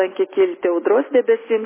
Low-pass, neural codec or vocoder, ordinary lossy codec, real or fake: 3.6 kHz; none; MP3, 16 kbps; real